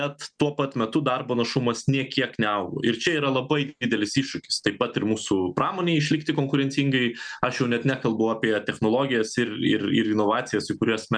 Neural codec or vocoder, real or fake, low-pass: none; real; 9.9 kHz